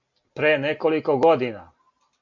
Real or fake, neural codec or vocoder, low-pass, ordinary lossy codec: real; none; 7.2 kHz; MP3, 48 kbps